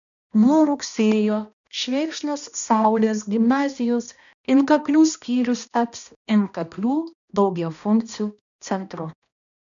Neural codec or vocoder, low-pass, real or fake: codec, 16 kHz, 1 kbps, X-Codec, HuBERT features, trained on balanced general audio; 7.2 kHz; fake